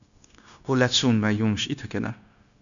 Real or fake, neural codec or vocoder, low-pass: fake; codec, 16 kHz, 0.9 kbps, LongCat-Audio-Codec; 7.2 kHz